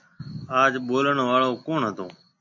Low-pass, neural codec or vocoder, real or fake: 7.2 kHz; none; real